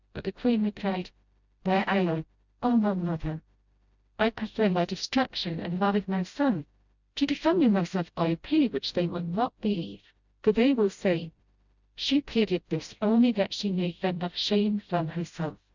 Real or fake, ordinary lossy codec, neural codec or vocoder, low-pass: fake; Opus, 64 kbps; codec, 16 kHz, 0.5 kbps, FreqCodec, smaller model; 7.2 kHz